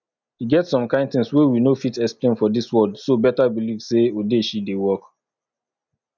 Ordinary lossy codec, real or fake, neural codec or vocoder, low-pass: none; real; none; 7.2 kHz